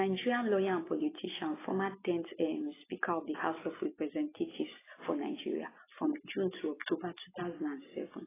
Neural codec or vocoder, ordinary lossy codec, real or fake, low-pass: none; AAC, 16 kbps; real; 3.6 kHz